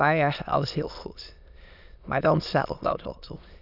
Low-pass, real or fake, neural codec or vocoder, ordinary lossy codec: 5.4 kHz; fake; autoencoder, 22.05 kHz, a latent of 192 numbers a frame, VITS, trained on many speakers; none